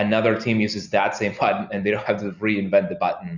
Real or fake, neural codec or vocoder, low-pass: real; none; 7.2 kHz